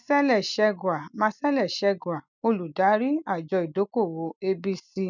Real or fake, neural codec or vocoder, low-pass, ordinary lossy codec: real; none; 7.2 kHz; none